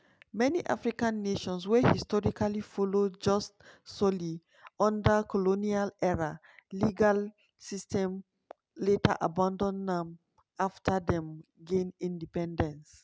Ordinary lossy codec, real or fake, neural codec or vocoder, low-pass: none; real; none; none